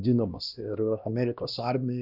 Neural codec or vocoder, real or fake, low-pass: codec, 16 kHz, 1 kbps, X-Codec, HuBERT features, trained on LibriSpeech; fake; 5.4 kHz